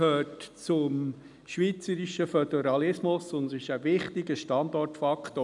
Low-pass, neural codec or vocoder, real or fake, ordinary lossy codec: 10.8 kHz; none; real; none